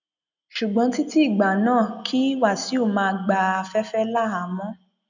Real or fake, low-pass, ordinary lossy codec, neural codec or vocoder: real; 7.2 kHz; none; none